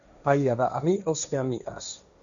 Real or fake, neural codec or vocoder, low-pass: fake; codec, 16 kHz, 1.1 kbps, Voila-Tokenizer; 7.2 kHz